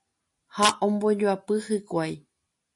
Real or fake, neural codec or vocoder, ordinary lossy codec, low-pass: real; none; MP3, 48 kbps; 10.8 kHz